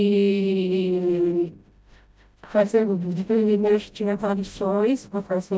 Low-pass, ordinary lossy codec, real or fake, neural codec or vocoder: none; none; fake; codec, 16 kHz, 0.5 kbps, FreqCodec, smaller model